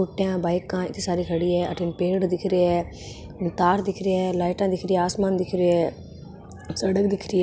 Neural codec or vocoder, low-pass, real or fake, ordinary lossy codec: none; none; real; none